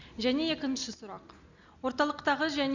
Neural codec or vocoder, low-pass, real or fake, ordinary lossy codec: none; 7.2 kHz; real; Opus, 64 kbps